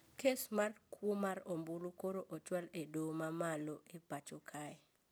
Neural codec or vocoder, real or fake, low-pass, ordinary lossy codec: none; real; none; none